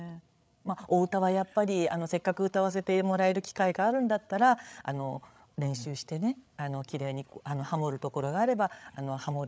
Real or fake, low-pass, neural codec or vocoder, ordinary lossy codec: fake; none; codec, 16 kHz, 8 kbps, FreqCodec, larger model; none